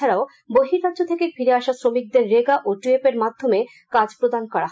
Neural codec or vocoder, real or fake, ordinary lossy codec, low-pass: none; real; none; none